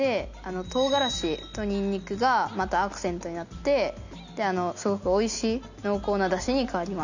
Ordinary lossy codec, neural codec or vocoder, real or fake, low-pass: none; none; real; 7.2 kHz